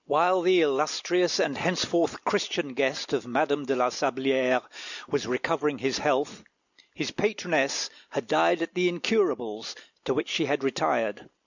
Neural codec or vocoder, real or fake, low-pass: none; real; 7.2 kHz